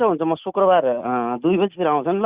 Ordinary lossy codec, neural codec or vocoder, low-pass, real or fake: Opus, 64 kbps; none; 3.6 kHz; real